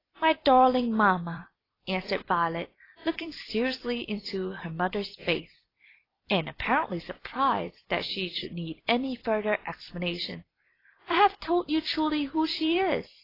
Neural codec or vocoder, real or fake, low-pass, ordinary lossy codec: vocoder, 44.1 kHz, 128 mel bands every 256 samples, BigVGAN v2; fake; 5.4 kHz; AAC, 24 kbps